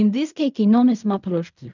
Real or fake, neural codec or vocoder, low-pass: fake; codec, 16 kHz in and 24 kHz out, 0.4 kbps, LongCat-Audio-Codec, fine tuned four codebook decoder; 7.2 kHz